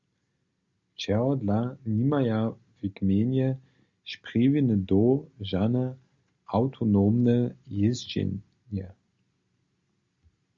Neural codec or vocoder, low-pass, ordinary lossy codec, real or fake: none; 7.2 kHz; Opus, 64 kbps; real